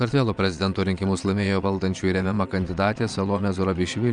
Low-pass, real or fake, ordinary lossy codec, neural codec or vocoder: 9.9 kHz; fake; MP3, 96 kbps; vocoder, 22.05 kHz, 80 mel bands, Vocos